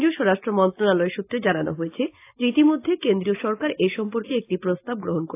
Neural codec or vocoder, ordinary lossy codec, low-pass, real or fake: none; AAC, 24 kbps; 3.6 kHz; real